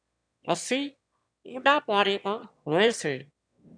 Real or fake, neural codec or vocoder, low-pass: fake; autoencoder, 22.05 kHz, a latent of 192 numbers a frame, VITS, trained on one speaker; 9.9 kHz